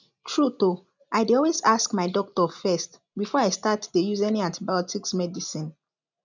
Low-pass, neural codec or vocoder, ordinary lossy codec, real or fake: 7.2 kHz; none; none; real